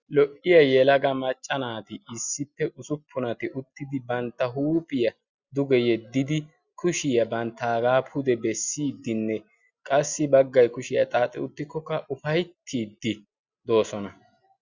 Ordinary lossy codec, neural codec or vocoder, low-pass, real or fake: Opus, 64 kbps; none; 7.2 kHz; real